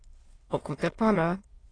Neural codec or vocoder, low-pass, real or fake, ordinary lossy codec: autoencoder, 22.05 kHz, a latent of 192 numbers a frame, VITS, trained on many speakers; 9.9 kHz; fake; AAC, 32 kbps